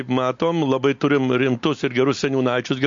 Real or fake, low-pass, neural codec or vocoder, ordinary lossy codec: real; 7.2 kHz; none; MP3, 48 kbps